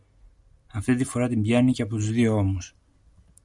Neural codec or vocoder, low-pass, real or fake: none; 10.8 kHz; real